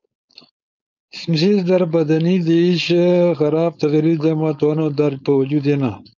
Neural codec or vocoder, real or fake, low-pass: codec, 16 kHz, 4.8 kbps, FACodec; fake; 7.2 kHz